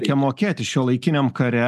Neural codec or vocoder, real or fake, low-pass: none; real; 14.4 kHz